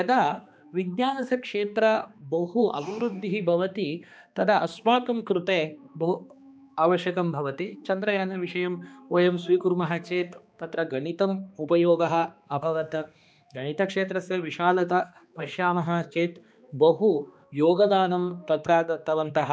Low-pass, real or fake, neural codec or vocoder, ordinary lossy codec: none; fake; codec, 16 kHz, 2 kbps, X-Codec, HuBERT features, trained on balanced general audio; none